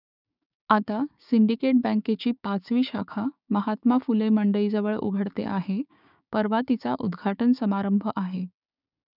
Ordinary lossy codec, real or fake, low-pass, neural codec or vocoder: none; fake; 5.4 kHz; codec, 16 kHz, 6 kbps, DAC